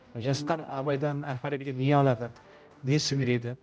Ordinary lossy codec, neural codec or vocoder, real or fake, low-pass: none; codec, 16 kHz, 0.5 kbps, X-Codec, HuBERT features, trained on general audio; fake; none